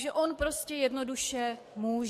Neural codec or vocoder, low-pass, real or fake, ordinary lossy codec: codec, 44.1 kHz, 7.8 kbps, DAC; 14.4 kHz; fake; MP3, 64 kbps